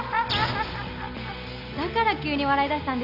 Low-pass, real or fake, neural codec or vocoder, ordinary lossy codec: 5.4 kHz; real; none; none